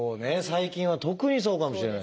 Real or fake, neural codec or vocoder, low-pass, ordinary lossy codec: real; none; none; none